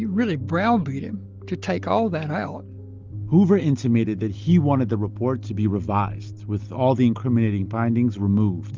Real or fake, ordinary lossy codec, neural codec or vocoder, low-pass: real; Opus, 32 kbps; none; 7.2 kHz